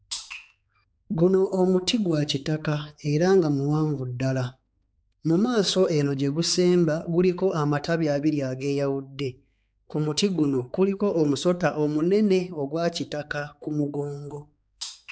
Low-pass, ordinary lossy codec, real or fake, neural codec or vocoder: none; none; fake; codec, 16 kHz, 4 kbps, X-Codec, HuBERT features, trained on balanced general audio